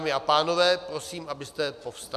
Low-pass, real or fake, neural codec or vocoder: 14.4 kHz; real; none